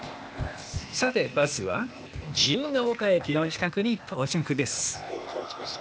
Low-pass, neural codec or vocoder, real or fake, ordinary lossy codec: none; codec, 16 kHz, 0.8 kbps, ZipCodec; fake; none